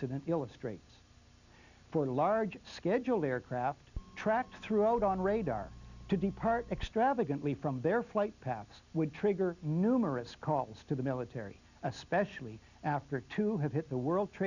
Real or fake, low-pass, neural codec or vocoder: real; 7.2 kHz; none